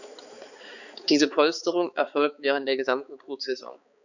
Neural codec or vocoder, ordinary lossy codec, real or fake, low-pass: codec, 16 kHz, 4 kbps, X-Codec, HuBERT features, trained on balanced general audio; none; fake; 7.2 kHz